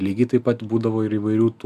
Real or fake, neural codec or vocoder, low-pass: real; none; 14.4 kHz